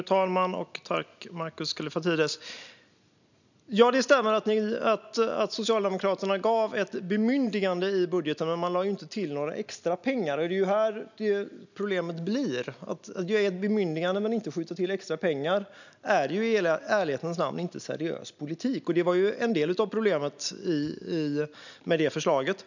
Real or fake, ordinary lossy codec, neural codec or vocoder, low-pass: real; none; none; 7.2 kHz